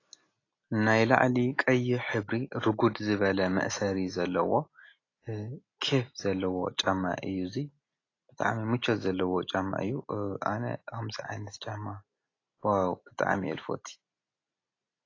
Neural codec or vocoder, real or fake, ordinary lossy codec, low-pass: none; real; AAC, 32 kbps; 7.2 kHz